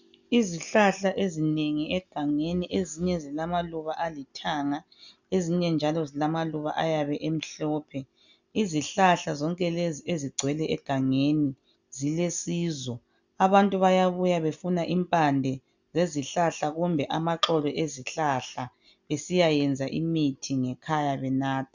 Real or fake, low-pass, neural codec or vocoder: real; 7.2 kHz; none